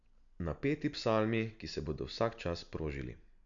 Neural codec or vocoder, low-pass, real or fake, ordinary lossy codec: none; 7.2 kHz; real; none